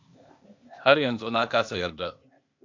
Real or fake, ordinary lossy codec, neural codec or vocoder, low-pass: fake; MP3, 64 kbps; codec, 16 kHz, 0.8 kbps, ZipCodec; 7.2 kHz